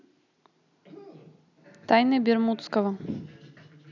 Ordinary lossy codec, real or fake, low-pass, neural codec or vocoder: none; real; 7.2 kHz; none